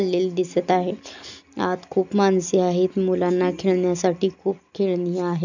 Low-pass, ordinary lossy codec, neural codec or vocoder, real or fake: 7.2 kHz; none; none; real